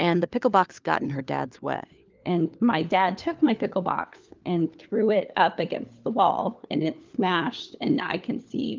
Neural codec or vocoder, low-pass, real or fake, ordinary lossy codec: codec, 16 kHz, 4 kbps, FunCodec, trained on LibriTTS, 50 frames a second; 7.2 kHz; fake; Opus, 32 kbps